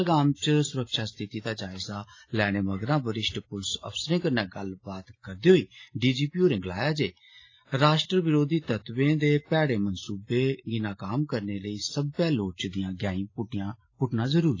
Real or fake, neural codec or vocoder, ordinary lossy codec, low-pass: real; none; AAC, 32 kbps; 7.2 kHz